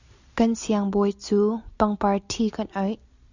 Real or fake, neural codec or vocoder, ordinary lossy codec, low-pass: real; none; Opus, 64 kbps; 7.2 kHz